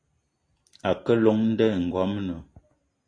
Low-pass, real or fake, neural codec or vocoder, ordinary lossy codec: 9.9 kHz; real; none; AAC, 32 kbps